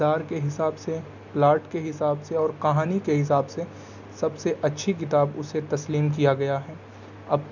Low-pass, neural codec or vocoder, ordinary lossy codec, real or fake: 7.2 kHz; none; none; real